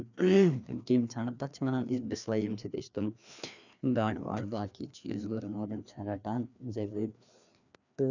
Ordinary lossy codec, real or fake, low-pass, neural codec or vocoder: none; fake; 7.2 kHz; codec, 16 kHz, 2 kbps, FreqCodec, larger model